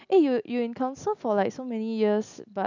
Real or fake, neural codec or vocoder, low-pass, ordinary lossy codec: real; none; 7.2 kHz; none